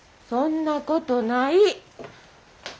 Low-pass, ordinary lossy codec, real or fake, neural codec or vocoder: none; none; real; none